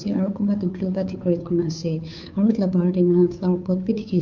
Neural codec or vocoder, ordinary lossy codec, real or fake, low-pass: codec, 16 kHz, 2 kbps, FunCodec, trained on Chinese and English, 25 frames a second; MP3, 48 kbps; fake; 7.2 kHz